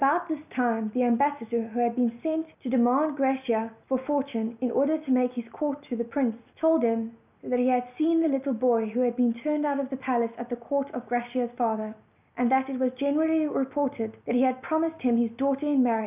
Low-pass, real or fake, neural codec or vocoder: 3.6 kHz; real; none